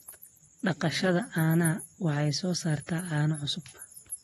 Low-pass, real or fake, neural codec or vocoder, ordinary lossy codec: 19.8 kHz; real; none; AAC, 32 kbps